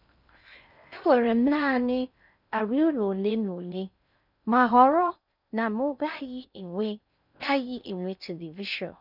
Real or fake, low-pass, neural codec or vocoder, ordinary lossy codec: fake; 5.4 kHz; codec, 16 kHz in and 24 kHz out, 0.6 kbps, FocalCodec, streaming, 2048 codes; none